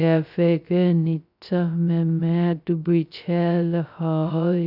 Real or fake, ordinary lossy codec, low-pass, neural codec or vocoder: fake; none; 5.4 kHz; codec, 16 kHz, 0.2 kbps, FocalCodec